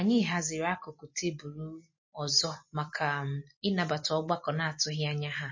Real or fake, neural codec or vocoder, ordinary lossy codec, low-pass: fake; vocoder, 44.1 kHz, 128 mel bands every 256 samples, BigVGAN v2; MP3, 32 kbps; 7.2 kHz